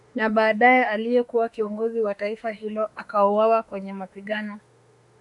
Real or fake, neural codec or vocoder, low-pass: fake; autoencoder, 48 kHz, 32 numbers a frame, DAC-VAE, trained on Japanese speech; 10.8 kHz